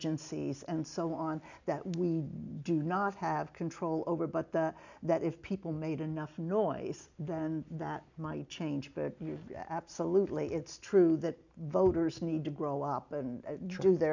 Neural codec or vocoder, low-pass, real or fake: none; 7.2 kHz; real